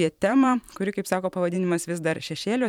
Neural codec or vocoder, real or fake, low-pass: vocoder, 44.1 kHz, 128 mel bands every 512 samples, BigVGAN v2; fake; 19.8 kHz